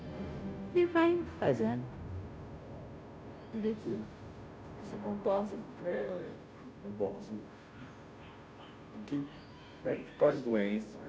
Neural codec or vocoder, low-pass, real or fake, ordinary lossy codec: codec, 16 kHz, 0.5 kbps, FunCodec, trained on Chinese and English, 25 frames a second; none; fake; none